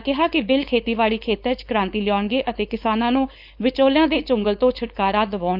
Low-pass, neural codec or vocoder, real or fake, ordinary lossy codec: 5.4 kHz; codec, 16 kHz, 4.8 kbps, FACodec; fake; Opus, 64 kbps